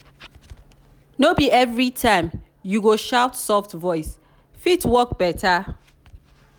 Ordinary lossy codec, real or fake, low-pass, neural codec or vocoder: none; real; none; none